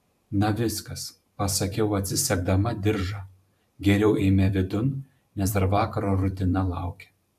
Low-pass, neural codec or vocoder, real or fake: 14.4 kHz; vocoder, 44.1 kHz, 128 mel bands every 512 samples, BigVGAN v2; fake